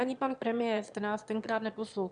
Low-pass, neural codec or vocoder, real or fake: 9.9 kHz; autoencoder, 22.05 kHz, a latent of 192 numbers a frame, VITS, trained on one speaker; fake